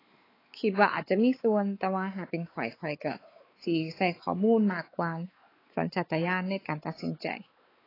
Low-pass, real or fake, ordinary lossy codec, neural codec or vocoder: 5.4 kHz; fake; AAC, 24 kbps; codec, 16 kHz, 4 kbps, X-Codec, WavLM features, trained on Multilingual LibriSpeech